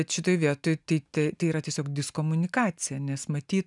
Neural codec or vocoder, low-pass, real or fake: none; 10.8 kHz; real